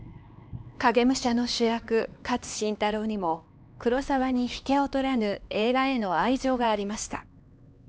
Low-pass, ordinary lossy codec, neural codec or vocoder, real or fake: none; none; codec, 16 kHz, 2 kbps, X-Codec, HuBERT features, trained on LibriSpeech; fake